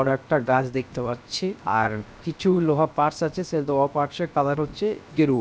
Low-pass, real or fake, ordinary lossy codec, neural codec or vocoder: none; fake; none; codec, 16 kHz, 0.7 kbps, FocalCodec